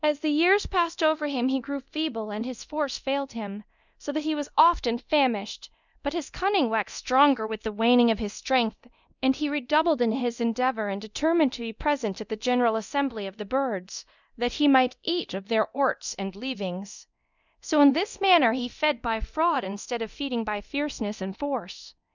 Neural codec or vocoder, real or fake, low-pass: codec, 24 kHz, 0.9 kbps, DualCodec; fake; 7.2 kHz